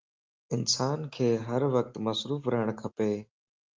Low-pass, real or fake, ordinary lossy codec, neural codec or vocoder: 7.2 kHz; real; Opus, 24 kbps; none